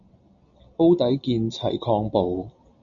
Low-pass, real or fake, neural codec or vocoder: 7.2 kHz; real; none